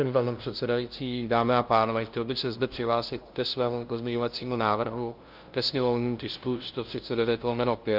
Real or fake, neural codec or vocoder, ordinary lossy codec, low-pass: fake; codec, 16 kHz, 0.5 kbps, FunCodec, trained on LibriTTS, 25 frames a second; Opus, 32 kbps; 5.4 kHz